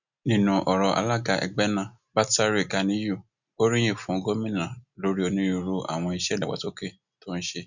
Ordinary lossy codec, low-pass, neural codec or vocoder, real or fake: none; 7.2 kHz; none; real